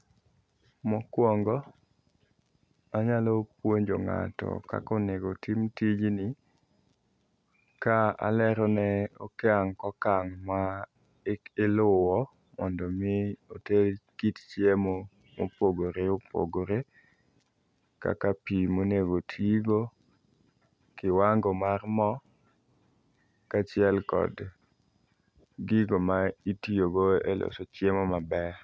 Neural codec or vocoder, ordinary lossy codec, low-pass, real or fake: none; none; none; real